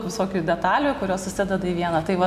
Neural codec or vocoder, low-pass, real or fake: none; 14.4 kHz; real